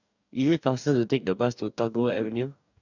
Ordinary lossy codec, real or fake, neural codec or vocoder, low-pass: none; fake; codec, 44.1 kHz, 2.6 kbps, DAC; 7.2 kHz